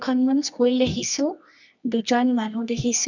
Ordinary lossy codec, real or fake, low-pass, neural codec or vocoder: none; fake; 7.2 kHz; codec, 24 kHz, 0.9 kbps, WavTokenizer, medium music audio release